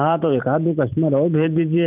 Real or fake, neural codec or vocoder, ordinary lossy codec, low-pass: fake; vocoder, 44.1 kHz, 128 mel bands every 256 samples, BigVGAN v2; none; 3.6 kHz